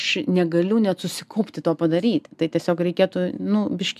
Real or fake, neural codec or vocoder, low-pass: real; none; 14.4 kHz